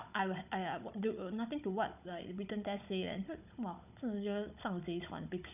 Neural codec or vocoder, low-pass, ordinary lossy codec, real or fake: codec, 16 kHz, 16 kbps, FunCodec, trained on Chinese and English, 50 frames a second; 3.6 kHz; none; fake